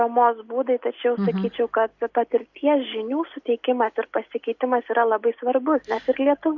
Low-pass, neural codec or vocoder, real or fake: 7.2 kHz; none; real